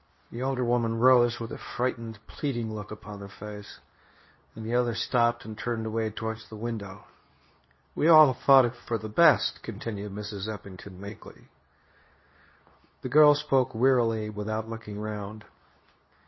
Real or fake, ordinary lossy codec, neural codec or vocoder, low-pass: fake; MP3, 24 kbps; codec, 24 kHz, 0.9 kbps, WavTokenizer, medium speech release version 2; 7.2 kHz